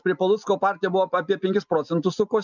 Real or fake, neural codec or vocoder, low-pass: real; none; 7.2 kHz